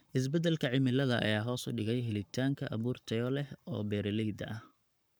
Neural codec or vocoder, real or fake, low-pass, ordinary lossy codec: codec, 44.1 kHz, 7.8 kbps, Pupu-Codec; fake; none; none